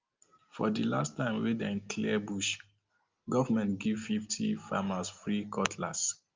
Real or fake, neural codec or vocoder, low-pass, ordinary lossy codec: real; none; 7.2 kHz; Opus, 24 kbps